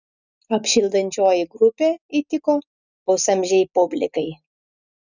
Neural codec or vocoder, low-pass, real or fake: none; 7.2 kHz; real